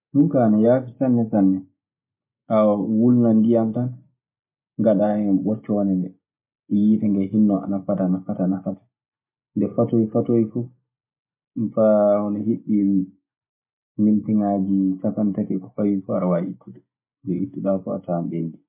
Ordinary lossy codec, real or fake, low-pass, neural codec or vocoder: MP3, 24 kbps; real; 3.6 kHz; none